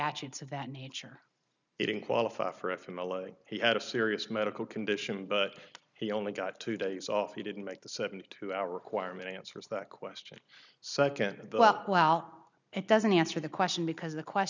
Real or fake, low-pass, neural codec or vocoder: real; 7.2 kHz; none